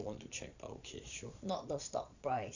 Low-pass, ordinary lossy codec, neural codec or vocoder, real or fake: 7.2 kHz; none; vocoder, 22.05 kHz, 80 mel bands, Vocos; fake